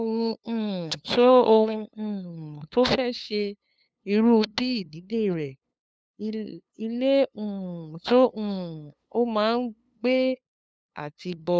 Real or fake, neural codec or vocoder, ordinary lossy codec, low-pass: fake; codec, 16 kHz, 2 kbps, FunCodec, trained on LibriTTS, 25 frames a second; none; none